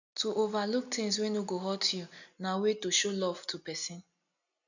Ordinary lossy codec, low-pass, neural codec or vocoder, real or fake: none; 7.2 kHz; none; real